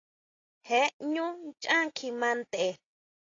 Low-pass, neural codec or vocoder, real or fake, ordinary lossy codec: 7.2 kHz; none; real; AAC, 32 kbps